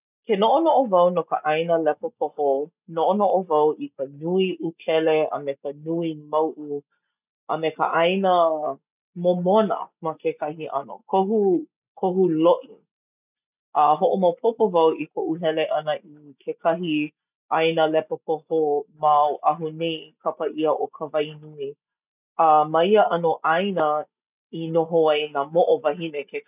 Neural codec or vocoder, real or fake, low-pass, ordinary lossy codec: none; real; 3.6 kHz; none